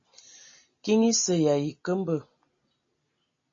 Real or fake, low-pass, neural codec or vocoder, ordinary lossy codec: real; 7.2 kHz; none; MP3, 32 kbps